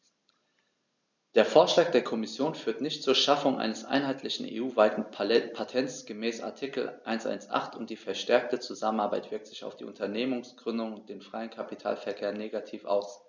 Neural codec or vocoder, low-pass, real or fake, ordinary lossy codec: none; 7.2 kHz; real; none